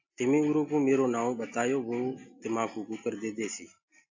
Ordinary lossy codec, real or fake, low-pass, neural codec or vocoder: AAC, 48 kbps; real; 7.2 kHz; none